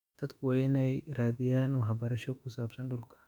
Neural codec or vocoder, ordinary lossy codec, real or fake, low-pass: autoencoder, 48 kHz, 32 numbers a frame, DAC-VAE, trained on Japanese speech; none; fake; 19.8 kHz